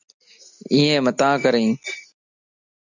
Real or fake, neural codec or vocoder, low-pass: real; none; 7.2 kHz